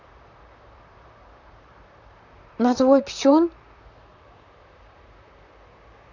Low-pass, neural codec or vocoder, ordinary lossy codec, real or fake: 7.2 kHz; vocoder, 44.1 kHz, 128 mel bands, Pupu-Vocoder; none; fake